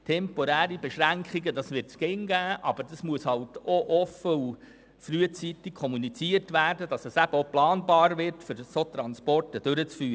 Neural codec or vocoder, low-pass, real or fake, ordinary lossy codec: none; none; real; none